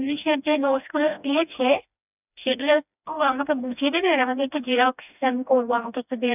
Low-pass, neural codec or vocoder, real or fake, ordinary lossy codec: 3.6 kHz; codec, 16 kHz, 1 kbps, FreqCodec, smaller model; fake; none